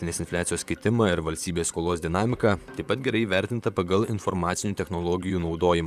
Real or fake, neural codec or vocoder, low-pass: fake; vocoder, 44.1 kHz, 128 mel bands, Pupu-Vocoder; 14.4 kHz